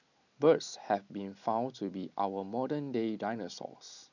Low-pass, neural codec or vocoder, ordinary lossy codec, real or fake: 7.2 kHz; none; none; real